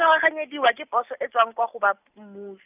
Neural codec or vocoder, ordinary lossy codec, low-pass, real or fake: none; none; 3.6 kHz; real